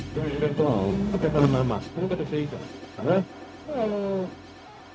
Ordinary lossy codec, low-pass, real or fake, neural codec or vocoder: none; none; fake; codec, 16 kHz, 0.4 kbps, LongCat-Audio-Codec